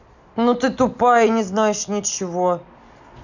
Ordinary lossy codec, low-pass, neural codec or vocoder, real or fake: none; 7.2 kHz; none; real